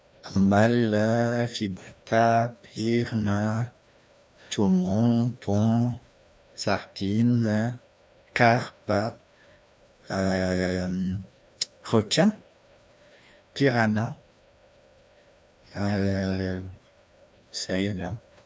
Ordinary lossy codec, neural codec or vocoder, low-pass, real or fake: none; codec, 16 kHz, 1 kbps, FreqCodec, larger model; none; fake